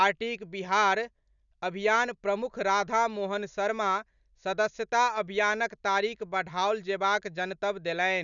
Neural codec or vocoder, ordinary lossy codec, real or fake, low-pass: none; none; real; 7.2 kHz